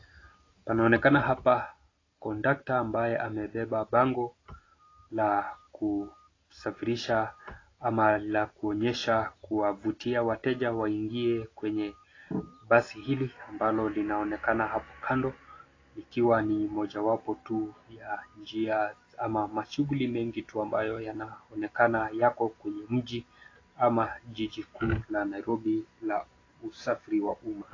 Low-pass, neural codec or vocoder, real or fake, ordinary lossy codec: 7.2 kHz; none; real; AAC, 32 kbps